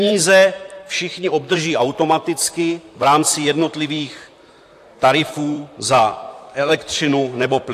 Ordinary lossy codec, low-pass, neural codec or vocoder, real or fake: AAC, 64 kbps; 14.4 kHz; vocoder, 44.1 kHz, 128 mel bands, Pupu-Vocoder; fake